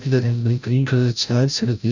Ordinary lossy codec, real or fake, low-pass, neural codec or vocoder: none; fake; 7.2 kHz; codec, 16 kHz, 0.5 kbps, FreqCodec, larger model